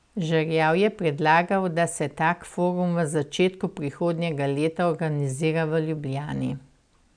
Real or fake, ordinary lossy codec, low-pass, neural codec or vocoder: real; none; 9.9 kHz; none